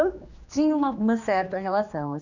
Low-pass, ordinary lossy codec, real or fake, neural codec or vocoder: 7.2 kHz; none; fake; codec, 16 kHz, 2 kbps, X-Codec, HuBERT features, trained on general audio